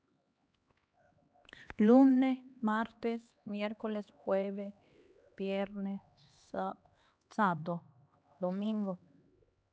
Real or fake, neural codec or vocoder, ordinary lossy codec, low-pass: fake; codec, 16 kHz, 2 kbps, X-Codec, HuBERT features, trained on LibriSpeech; none; none